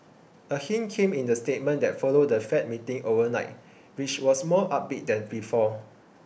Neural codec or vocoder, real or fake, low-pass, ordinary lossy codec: none; real; none; none